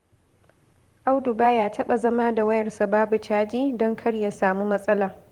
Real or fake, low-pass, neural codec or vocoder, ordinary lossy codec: fake; 19.8 kHz; vocoder, 44.1 kHz, 128 mel bands, Pupu-Vocoder; Opus, 24 kbps